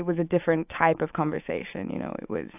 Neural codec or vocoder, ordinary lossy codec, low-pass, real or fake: autoencoder, 48 kHz, 128 numbers a frame, DAC-VAE, trained on Japanese speech; AAC, 32 kbps; 3.6 kHz; fake